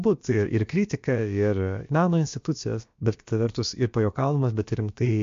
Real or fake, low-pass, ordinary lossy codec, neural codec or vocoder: fake; 7.2 kHz; MP3, 48 kbps; codec, 16 kHz, about 1 kbps, DyCAST, with the encoder's durations